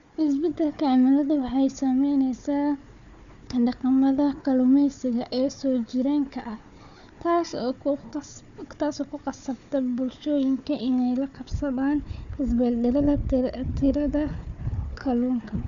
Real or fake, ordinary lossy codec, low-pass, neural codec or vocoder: fake; none; 7.2 kHz; codec, 16 kHz, 4 kbps, FunCodec, trained on Chinese and English, 50 frames a second